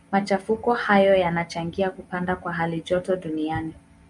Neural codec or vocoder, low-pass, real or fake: none; 10.8 kHz; real